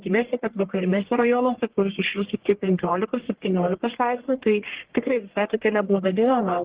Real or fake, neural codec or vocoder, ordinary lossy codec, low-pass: fake; codec, 44.1 kHz, 1.7 kbps, Pupu-Codec; Opus, 16 kbps; 3.6 kHz